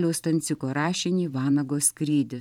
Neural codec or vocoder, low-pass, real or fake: vocoder, 44.1 kHz, 128 mel bands every 512 samples, BigVGAN v2; 19.8 kHz; fake